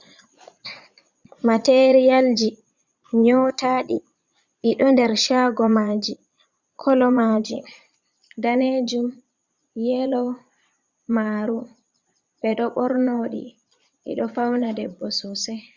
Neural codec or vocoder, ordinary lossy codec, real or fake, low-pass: vocoder, 22.05 kHz, 80 mel bands, Vocos; Opus, 64 kbps; fake; 7.2 kHz